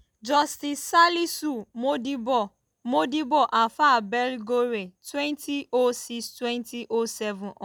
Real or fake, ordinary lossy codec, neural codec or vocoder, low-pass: real; none; none; none